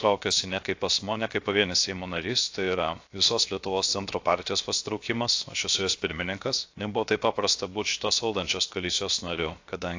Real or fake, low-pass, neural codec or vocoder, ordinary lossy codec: fake; 7.2 kHz; codec, 16 kHz, 0.7 kbps, FocalCodec; AAC, 48 kbps